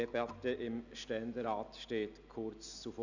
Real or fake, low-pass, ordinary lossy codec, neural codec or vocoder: real; 7.2 kHz; none; none